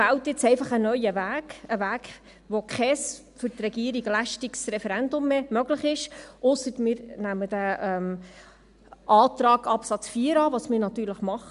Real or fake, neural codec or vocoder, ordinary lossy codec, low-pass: real; none; none; 10.8 kHz